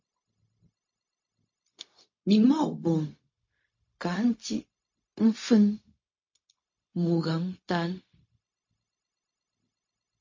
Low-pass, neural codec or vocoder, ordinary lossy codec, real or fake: 7.2 kHz; codec, 16 kHz, 0.4 kbps, LongCat-Audio-Codec; MP3, 32 kbps; fake